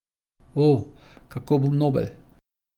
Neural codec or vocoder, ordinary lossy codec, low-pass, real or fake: none; Opus, 32 kbps; 19.8 kHz; real